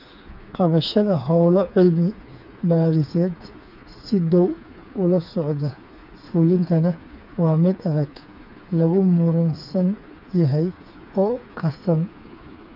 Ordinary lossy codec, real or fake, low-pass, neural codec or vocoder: none; fake; 5.4 kHz; codec, 16 kHz, 4 kbps, FreqCodec, smaller model